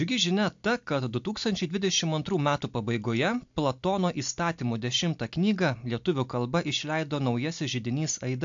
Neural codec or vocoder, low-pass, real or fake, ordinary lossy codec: none; 7.2 kHz; real; AAC, 64 kbps